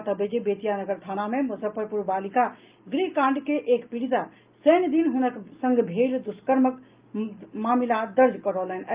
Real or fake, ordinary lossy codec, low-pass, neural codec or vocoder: real; Opus, 32 kbps; 3.6 kHz; none